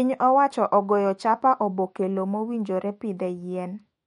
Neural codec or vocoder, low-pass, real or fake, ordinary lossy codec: autoencoder, 48 kHz, 128 numbers a frame, DAC-VAE, trained on Japanese speech; 19.8 kHz; fake; MP3, 48 kbps